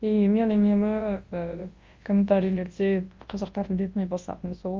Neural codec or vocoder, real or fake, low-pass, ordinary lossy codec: codec, 24 kHz, 0.9 kbps, WavTokenizer, large speech release; fake; 7.2 kHz; Opus, 32 kbps